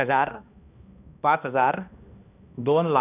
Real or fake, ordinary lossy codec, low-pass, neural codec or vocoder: fake; none; 3.6 kHz; codec, 16 kHz, 1 kbps, X-Codec, HuBERT features, trained on balanced general audio